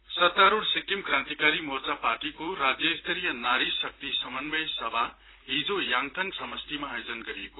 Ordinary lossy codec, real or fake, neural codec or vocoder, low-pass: AAC, 16 kbps; fake; vocoder, 44.1 kHz, 128 mel bands, Pupu-Vocoder; 7.2 kHz